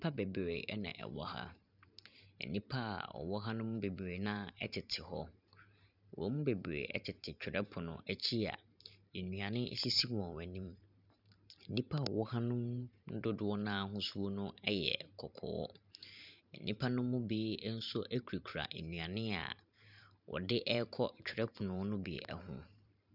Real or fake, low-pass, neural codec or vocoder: fake; 5.4 kHz; vocoder, 44.1 kHz, 128 mel bands every 512 samples, BigVGAN v2